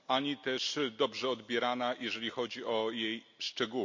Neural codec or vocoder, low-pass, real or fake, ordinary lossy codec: none; 7.2 kHz; real; MP3, 64 kbps